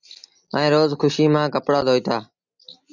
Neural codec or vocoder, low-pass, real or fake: none; 7.2 kHz; real